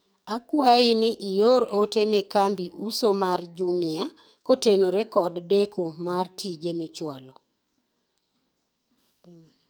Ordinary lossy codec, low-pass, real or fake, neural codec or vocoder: none; none; fake; codec, 44.1 kHz, 2.6 kbps, SNAC